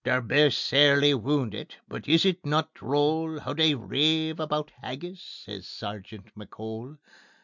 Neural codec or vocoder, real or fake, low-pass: none; real; 7.2 kHz